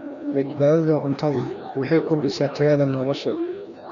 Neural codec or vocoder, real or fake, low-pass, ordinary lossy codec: codec, 16 kHz, 1 kbps, FreqCodec, larger model; fake; 7.2 kHz; none